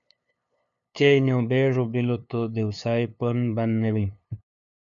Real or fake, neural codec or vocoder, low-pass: fake; codec, 16 kHz, 2 kbps, FunCodec, trained on LibriTTS, 25 frames a second; 7.2 kHz